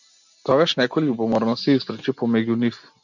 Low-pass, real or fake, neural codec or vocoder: 7.2 kHz; real; none